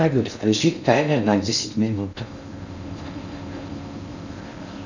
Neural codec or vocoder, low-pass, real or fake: codec, 16 kHz in and 24 kHz out, 0.6 kbps, FocalCodec, streaming, 4096 codes; 7.2 kHz; fake